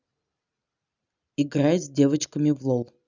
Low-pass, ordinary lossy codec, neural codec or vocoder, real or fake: 7.2 kHz; none; none; real